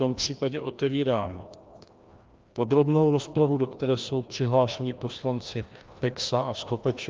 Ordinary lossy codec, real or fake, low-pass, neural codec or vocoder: Opus, 24 kbps; fake; 7.2 kHz; codec, 16 kHz, 1 kbps, FreqCodec, larger model